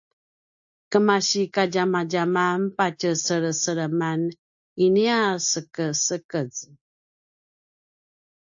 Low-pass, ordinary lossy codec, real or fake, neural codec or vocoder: 7.2 kHz; AAC, 64 kbps; real; none